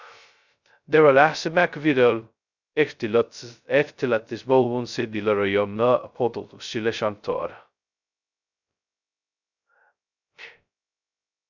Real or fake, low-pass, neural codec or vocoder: fake; 7.2 kHz; codec, 16 kHz, 0.2 kbps, FocalCodec